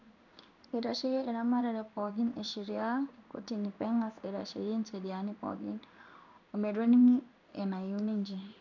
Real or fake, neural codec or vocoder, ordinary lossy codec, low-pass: fake; codec, 16 kHz in and 24 kHz out, 1 kbps, XY-Tokenizer; none; 7.2 kHz